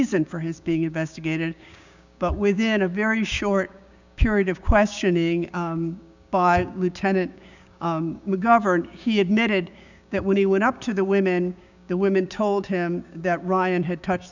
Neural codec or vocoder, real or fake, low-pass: codec, 16 kHz, 6 kbps, DAC; fake; 7.2 kHz